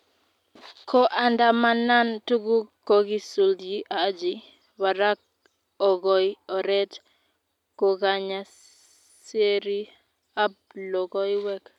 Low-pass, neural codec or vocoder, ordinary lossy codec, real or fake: 19.8 kHz; none; none; real